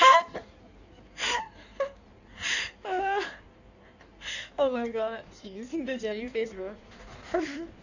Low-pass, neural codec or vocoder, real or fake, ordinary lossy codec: 7.2 kHz; codec, 16 kHz in and 24 kHz out, 1.1 kbps, FireRedTTS-2 codec; fake; none